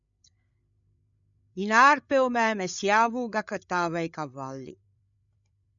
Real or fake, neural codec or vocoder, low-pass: fake; codec, 16 kHz, 8 kbps, FreqCodec, larger model; 7.2 kHz